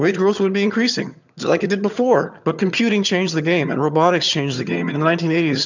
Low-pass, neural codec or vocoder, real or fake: 7.2 kHz; vocoder, 22.05 kHz, 80 mel bands, HiFi-GAN; fake